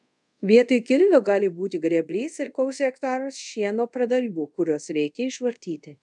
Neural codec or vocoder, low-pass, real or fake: codec, 24 kHz, 0.5 kbps, DualCodec; 10.8 kHz; fake